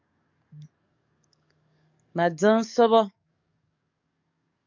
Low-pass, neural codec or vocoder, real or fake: 7.2 kHz; codec, 44.1 kHz, 7.8 kbps, DAC; fake